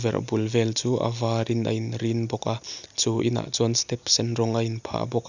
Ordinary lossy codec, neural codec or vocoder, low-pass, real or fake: none; none; 7.2 kHz; real